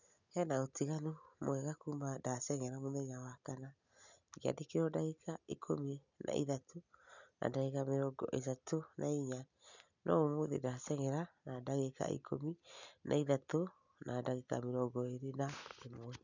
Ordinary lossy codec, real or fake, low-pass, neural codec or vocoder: none; real; 7.2 kHz; none